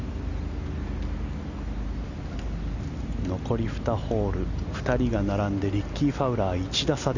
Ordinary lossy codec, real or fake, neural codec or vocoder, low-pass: none; real; none; 7.2 kHz